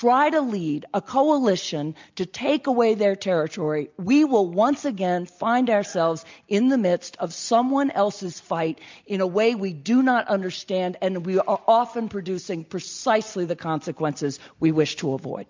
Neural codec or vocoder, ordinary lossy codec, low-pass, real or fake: none; MP3, 64 kbps; 7.2 kHz; real